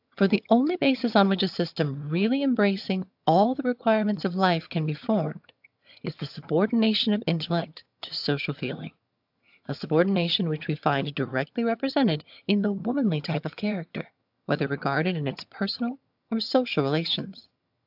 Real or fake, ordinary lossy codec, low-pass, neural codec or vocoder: fake; AAC, 48 kbps; 5.4 kHz; vocoder, 22.05 kHz, 80 mel bands, HiFi-GAN